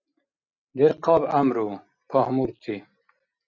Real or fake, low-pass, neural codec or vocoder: real; 7.2 kHz; none